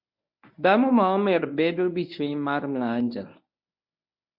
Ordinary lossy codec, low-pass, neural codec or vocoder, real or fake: MP3, 48 kbps; 5.4 kHz; codec, 24 kHz, 0.9 kbps, WavTokenizer, medium speech release version 1; fake